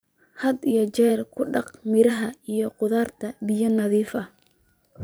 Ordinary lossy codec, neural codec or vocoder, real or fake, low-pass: none; vocoder, 44.1 kHz, 128 mel bands every 512 samples, BigVGAN v2; fake; none